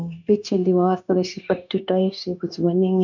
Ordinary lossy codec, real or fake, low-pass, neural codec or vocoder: none; fake; 7.2 kHz; codec, 16 kHz, 0.9 kbps, LongCat-Audio-Codec